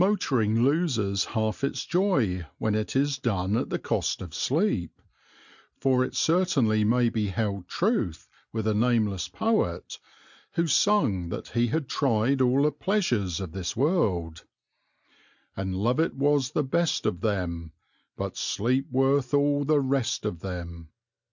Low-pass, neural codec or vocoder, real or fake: 7.2 kHz; none; real